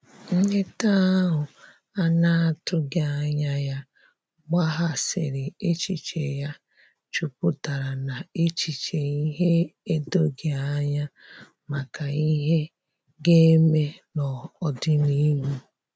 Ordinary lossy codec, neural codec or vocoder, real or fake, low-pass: none; none; real; none